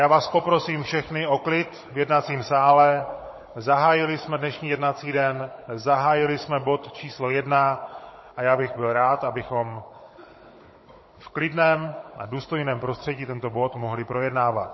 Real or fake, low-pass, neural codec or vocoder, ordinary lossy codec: fake; 7.2 kHz; codec, 16 kHz, 16 kbps, FunCodec, trained on Chinese and English, 50 frames a second; MP3, 24 kbps